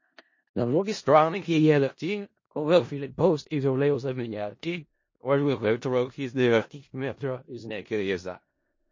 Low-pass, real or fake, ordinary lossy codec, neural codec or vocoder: 7.2 kHz; fake; MP3, 32 kbps; codec, 16 kHz in and 24 kHz out, 0.4 kbps, LongCat-Audio-Codec, four codebook decoder